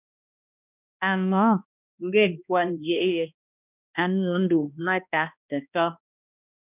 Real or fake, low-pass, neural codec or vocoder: fake; 3.6 kHz; codec, 16 kHz, 1 kbps, X-Codec, HuBERT features, trained on balanced general audio